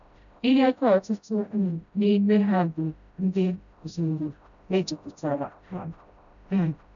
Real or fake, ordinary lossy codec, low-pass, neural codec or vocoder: fake; none; 7.2 kHz; codec, 16 kHz, 0.5 kbps, FreqCodec, smaller model